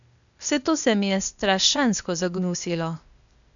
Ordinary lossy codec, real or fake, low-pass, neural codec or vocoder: none; fake; 7.2 kHz; codec, 16 kHz, 0.8 kbps, ZipCodec